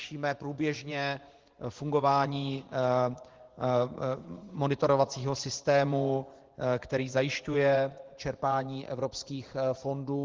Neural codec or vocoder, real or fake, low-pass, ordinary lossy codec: vocoder, 24 kHz, 100 mel bands, Vocos; fake; 7.2 kHz; Opus, 16 kbps